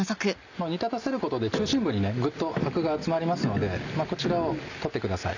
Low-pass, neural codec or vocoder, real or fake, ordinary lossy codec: 7.2 kHz; none; real; none